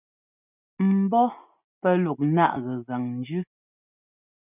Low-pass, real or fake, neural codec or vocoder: 3.6 kHz; real; none